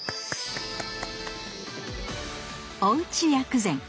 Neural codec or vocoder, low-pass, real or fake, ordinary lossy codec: none; none; real; none